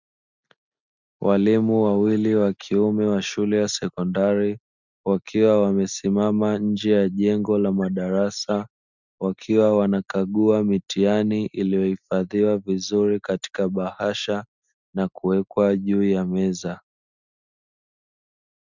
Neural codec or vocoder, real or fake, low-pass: none; real; 7.2 kHz